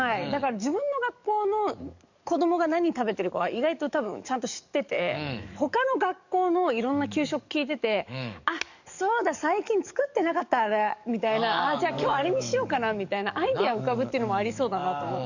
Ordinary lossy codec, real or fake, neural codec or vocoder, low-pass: none; fake; codec, 44.1 kHz, 7.8 kbps, DAC; 7.2 kHz